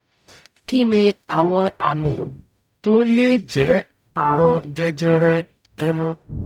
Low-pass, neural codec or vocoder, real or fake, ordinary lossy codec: 19.8 kHz; codec, 44.1 kHz, 0.9 kbps, DAC; fake; none